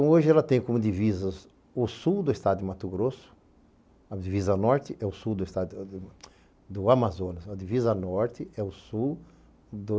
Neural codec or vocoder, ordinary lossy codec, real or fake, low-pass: none; none; real; none